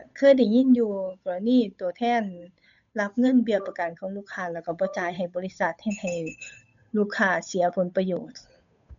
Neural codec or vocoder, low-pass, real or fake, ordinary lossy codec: codec, 16 kHz, 8 kbps, FunCodec, trained on Chinese and English, 25 frames a second; 7.2 kHz; fake; none